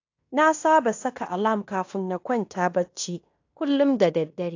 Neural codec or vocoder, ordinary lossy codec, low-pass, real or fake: codec, 16 kHz in and 24 kHz out, 0.9 kbps, LongCat-Audio-Codec, fine tuned four codebook decoder; AAC, 48 kbps; 7.2 kHz; fake